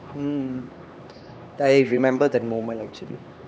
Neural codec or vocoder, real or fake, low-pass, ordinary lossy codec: codec, 16 kHz, 2 kbps, X-Codec, HuBERT features, trained on LibriSpeech; fake; none; none